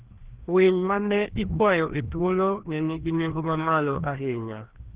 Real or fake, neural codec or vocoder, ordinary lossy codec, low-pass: fake; codec, 16 kHz, 1 kbps, FreqCodec, larger model; Opus, 16 kbps; 3.6 kHz